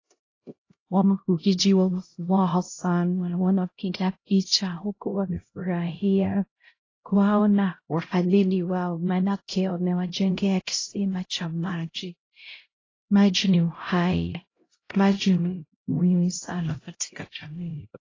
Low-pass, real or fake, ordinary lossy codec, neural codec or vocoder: 7.2 kHz; fake; AAC, 32 kbps; codec, 16 kHz, 0.5 kbps, X-Codec, HuBERT features, trained on LibriSpeech